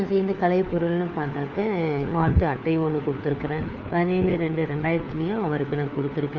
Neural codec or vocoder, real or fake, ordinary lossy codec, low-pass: codec, 16 kHz, 4 kbps, FunCodec, trained on LibriTTS, 50 frames a second; fake; Opus, 64 kbps; 7.2 kHz